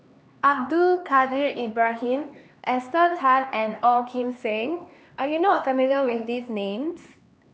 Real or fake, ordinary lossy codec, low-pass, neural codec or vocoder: fake; none; none; codec, 16 kHz, 2 kbps, X-Codec, HuBERT features, trained on LibriSpeech